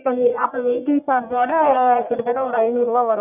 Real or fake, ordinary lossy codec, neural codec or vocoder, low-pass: fake; none; codec, 44.1 kHz, 1.7 kbps, Pupu-Codec; 3.6 kHz